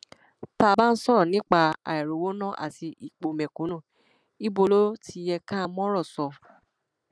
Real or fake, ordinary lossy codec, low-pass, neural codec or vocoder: real; none; none; none